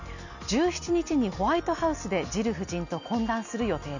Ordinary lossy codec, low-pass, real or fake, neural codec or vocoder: none; 7.2 kHz; real; none